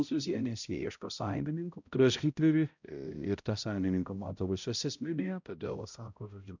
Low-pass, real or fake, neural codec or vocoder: 7.2 kHz; fake; codec, 16 kHz, 0.5 kbps, X-Codec, HuBERT features, trained on balanced general audio